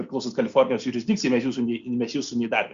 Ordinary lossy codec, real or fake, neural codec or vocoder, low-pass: Opus, 64 kbps; real; none; 7.2 kHz